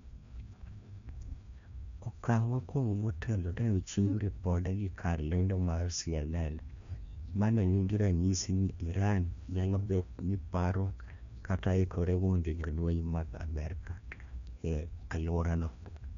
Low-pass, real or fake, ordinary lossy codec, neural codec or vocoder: 7.2 kHz; fake; MP3, 64 kbps; codec, 16 kHz, 1 kbps, FreqCodec, larger model